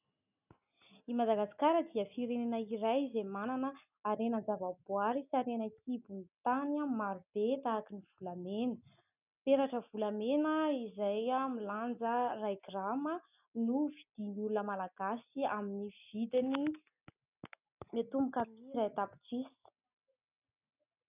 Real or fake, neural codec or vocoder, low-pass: real; none; 3.6 kHz